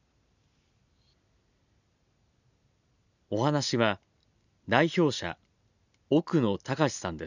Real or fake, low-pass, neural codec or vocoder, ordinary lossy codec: real; 7.2 kHz; none; none